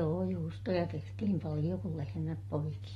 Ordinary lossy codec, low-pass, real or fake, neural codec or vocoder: AAC, 32 kbps; 19.8 kHz; real; none